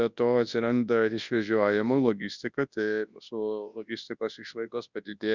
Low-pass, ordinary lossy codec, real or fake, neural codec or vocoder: 7.2 kHz; Opus, 64 kbps; fake; codec, 24 kHz, 0.9 kbps, WavTokenizer, large speech release